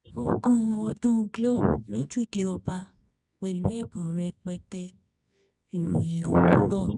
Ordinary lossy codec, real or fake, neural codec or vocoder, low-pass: none; fake; codec, 24 kHz, 0.9 kbps, WavTokenizer, medium music audio release; 10.8 kHz